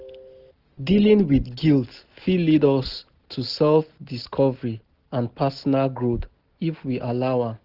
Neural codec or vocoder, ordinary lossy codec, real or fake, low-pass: none; Opus, 16 kbps; real; 5.4 kHz